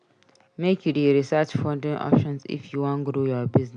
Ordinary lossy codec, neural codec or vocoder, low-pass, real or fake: none; none; 9.9 kHz; real